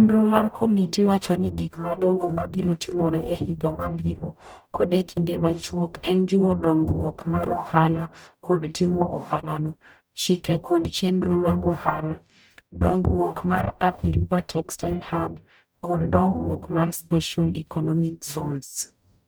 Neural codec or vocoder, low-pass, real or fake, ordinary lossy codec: codec, 44.1 kHz, 0.9 kbps, DAC; none; fake; none